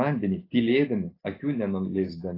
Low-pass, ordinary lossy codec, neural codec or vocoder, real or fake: 5.4 kHz; AAC, 24 kbps; none; real